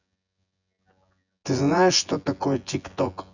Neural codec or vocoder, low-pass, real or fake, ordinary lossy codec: vocoder, 24 kHz, 100 mel bands, Vocos; 7.2 kHz; fake; none